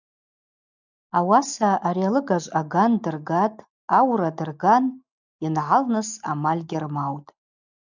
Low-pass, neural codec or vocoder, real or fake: 7.2 kHz; none; real